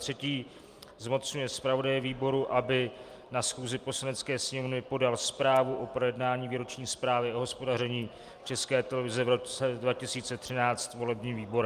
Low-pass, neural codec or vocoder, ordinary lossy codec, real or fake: 14.4 kHz; none; Opus, 32 kbps; real